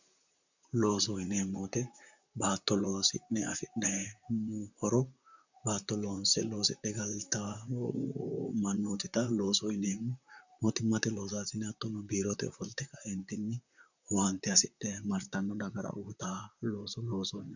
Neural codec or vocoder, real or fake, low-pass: vocoder, 44.1 kHz, 128 mel bands, Pupu-Vocoder; fake; 7.2 kHz